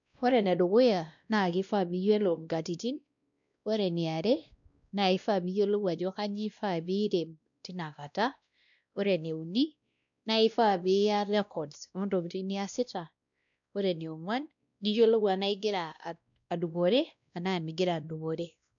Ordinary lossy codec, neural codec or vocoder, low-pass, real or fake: none; codec, 16 kHz, 1 kbps, X-Codec, WavLM features, trained on Multilingual LibriSpeech; 7.2 kHz; fake